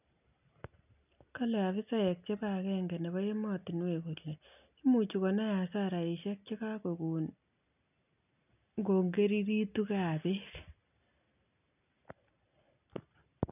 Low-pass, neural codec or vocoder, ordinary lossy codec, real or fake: 3.6 kHz; none; none; real